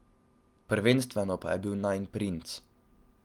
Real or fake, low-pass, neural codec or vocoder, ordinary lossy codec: real; 19.8 kHz; none; Opus, 32 kbps